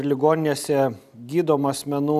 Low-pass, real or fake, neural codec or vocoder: 14.4 kHz; real; none